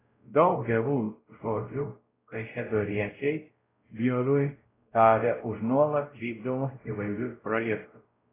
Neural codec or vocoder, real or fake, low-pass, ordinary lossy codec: codec, 16 kHz, 0.5 kbps, X-Codec, WavLM features, trained on Multilingual LibriSpeech; fake; 3.6 kHz; AAC, 16 kbps